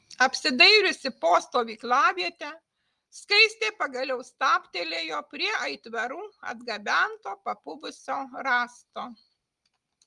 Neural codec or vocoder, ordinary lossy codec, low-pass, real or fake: none; Opus, 32 kbps; 10.8 kHz; real